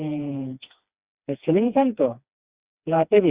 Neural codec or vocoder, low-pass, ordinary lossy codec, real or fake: codec, 16 kHz, 2 kbps, FreqCodec, smaller model; 3.6 kHz; Opus, 64 kbps; fake